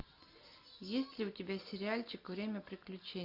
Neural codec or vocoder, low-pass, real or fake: none; 5.4 kHz; real